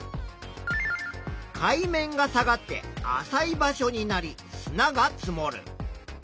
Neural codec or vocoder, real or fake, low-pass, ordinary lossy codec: none; real; none; none